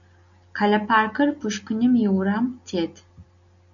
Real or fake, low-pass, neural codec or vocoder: real; 7.2 kHz; none